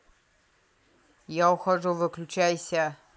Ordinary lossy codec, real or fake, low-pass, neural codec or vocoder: none; real; none; none